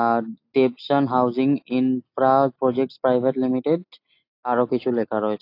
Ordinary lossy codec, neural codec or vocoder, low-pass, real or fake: AAC, 32 kbps; none; 5.4 kHz; real